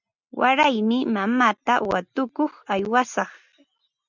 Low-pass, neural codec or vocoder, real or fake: 7.2 kHz; none; real